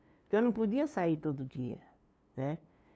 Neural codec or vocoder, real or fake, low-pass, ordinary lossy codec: codec, 16 kHz, 2 kbps, FunCodec, trained on LibriTTS, 25 frames a second; fake; none; none